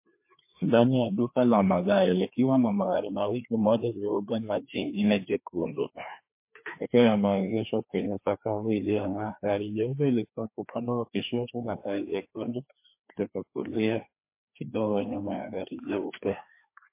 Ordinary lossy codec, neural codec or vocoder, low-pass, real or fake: MP3, 24 kbps; codec, 16 kHz, 2 kbps, FreqCodec, larger model; 3.6 kHz; fake